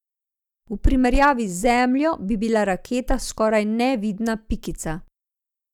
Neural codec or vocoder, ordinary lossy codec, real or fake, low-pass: none; none; real; 19.8 kHz